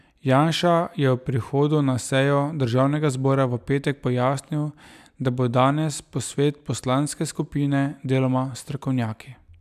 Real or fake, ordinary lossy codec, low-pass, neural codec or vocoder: real; none; 14.4 kHz; none